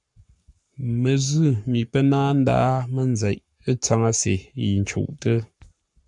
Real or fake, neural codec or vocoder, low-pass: fake; codec, 44.1 kHz, 7.8 kbps, Pupu-Codec; 10.8 kHz